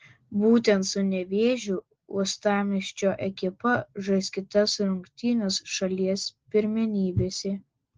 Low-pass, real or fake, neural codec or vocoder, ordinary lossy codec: 7.2 kHz; real; none; Opus, 16 kbps